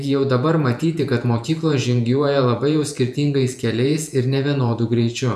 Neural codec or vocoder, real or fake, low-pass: vocoder, 48 kHz, 128 mel bands, Vocos; fake; 14.4 kHz